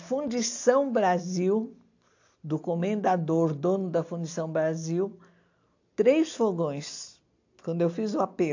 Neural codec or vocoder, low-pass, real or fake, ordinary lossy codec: none; 7.2 kHz; real; AAC, 48 kbps